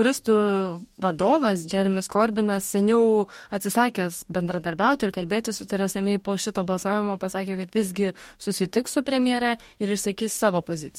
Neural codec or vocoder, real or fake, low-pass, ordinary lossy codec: codec, 44.1 kHz, 2.6 kbps, DAC; fake; 19.8 kHz; MP3, 64 kbps